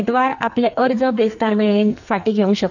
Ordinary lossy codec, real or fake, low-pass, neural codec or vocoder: none; fake; 7.2 kHz; codec, 44.1 kHz, 2.6 kbps, SNAC